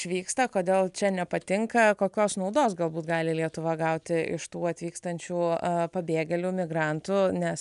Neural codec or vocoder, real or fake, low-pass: none; real; 10.8 kHz